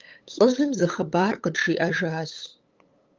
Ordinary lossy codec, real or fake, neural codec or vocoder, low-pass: Opus, 32 kbps; fake; codec, 16 kHz, 8 kbps, FunCodec, trained on LibriTTS, 25 frames a second; 7.2 kHz